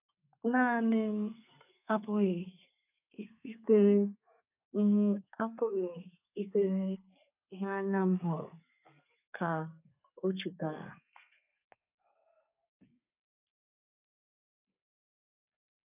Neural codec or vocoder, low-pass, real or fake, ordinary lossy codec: codec, 32 kHz, 1.9 kbps, SNAC; 3.6 kHz; fake; none